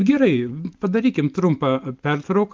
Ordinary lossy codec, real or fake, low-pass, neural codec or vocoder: Opus, 32 kbps; real; 7.2 kHz; none